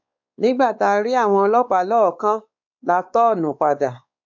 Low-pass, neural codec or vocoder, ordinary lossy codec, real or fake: 7.2 kHz; codec, 16 kHz, 4 kbps, X-Codec, WavLM features, trained on Multilingual LibriSpeech; MP3, 64 kbps; fake